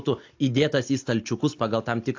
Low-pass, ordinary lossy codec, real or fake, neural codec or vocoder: 7.2 kHz; AAC, 48 kbps; real; none